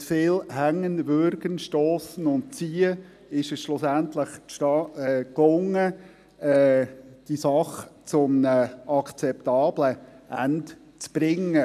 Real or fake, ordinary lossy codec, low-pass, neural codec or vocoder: real; none; 14.4 kHz; none